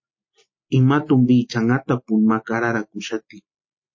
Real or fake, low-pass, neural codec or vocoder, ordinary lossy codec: real; 7.2 kHz; none; MP3, 32 kbps